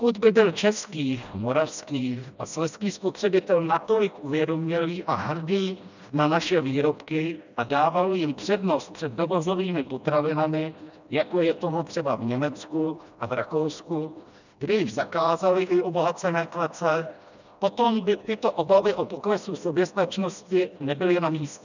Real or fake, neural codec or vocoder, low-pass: fake; codec, 16 kHz, 1 kbps, FreqCodec, smaller model; 7.2 kHz